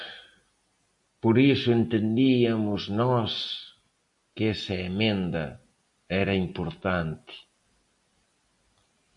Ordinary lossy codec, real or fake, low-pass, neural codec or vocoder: AAC, 64 kbps; real; 10.8 kHz; none